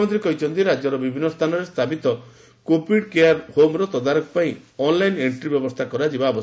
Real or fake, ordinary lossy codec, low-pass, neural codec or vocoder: real; none; none; none